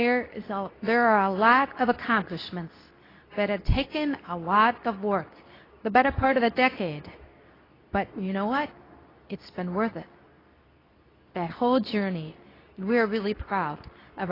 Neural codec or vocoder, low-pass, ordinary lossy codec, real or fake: codec, 24 kHz, 0.9 kbps, WavTokenizer, medium speech release version 2; 5.4 kHz; AAC, 24 kbps; fake